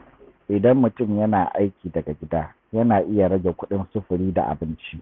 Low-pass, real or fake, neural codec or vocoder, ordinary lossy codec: 7.2 kHz; real; none; none